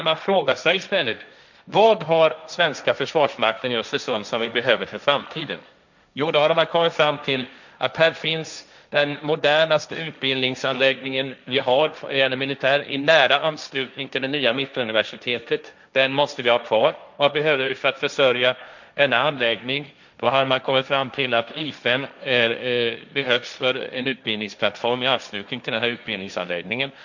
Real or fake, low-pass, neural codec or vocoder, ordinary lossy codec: fake; 7.2 kHz; codec, 16 kHz, 1.1 kbps, Voila-Tokenizer; none